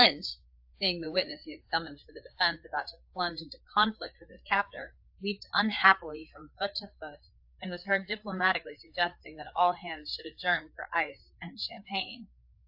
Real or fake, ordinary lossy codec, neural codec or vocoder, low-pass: fake; AAC, 48 kbps; codec, 16 kHz, 4 kbps, FreqCodec, larger model; 5.4 kHz